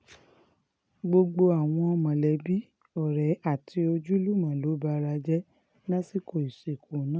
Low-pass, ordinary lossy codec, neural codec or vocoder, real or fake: none; none; none; real